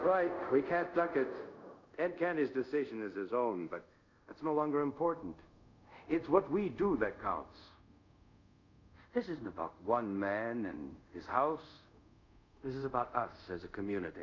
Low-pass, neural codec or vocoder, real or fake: 7.2 kHz; codec, 24 kHz, 0.5 kbps, DualCodec; fake